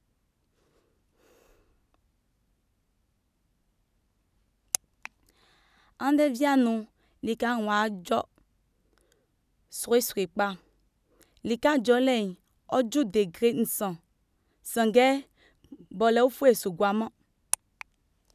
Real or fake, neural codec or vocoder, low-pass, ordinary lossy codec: real; none; 14.4 kHz; none